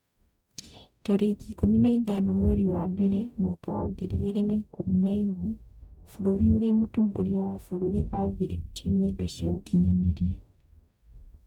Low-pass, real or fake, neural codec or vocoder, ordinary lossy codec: 19.8 kHz; fake; codec, 44.1 kHz, 0.9 kbps, DAC; none